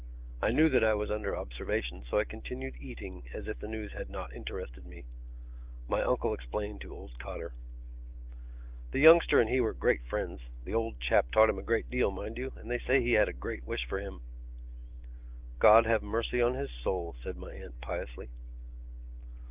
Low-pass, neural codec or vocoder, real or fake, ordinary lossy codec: 3.6 kHz; none; real; Opus, 24 kbps